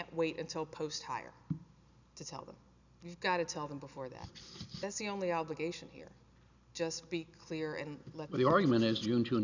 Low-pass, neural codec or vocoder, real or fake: 7.2 kHz; none; real